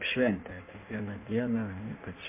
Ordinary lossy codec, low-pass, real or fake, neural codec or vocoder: MP3, 24 kbps; 3.6 kHz; fake; codec, 16 kHz in and 24 kHz out, 1.1 kbps, FireRedTTS-2 codec